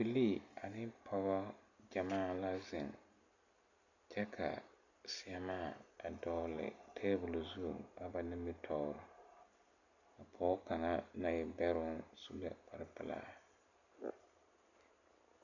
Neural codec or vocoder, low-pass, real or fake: none; 7.2 kHz; real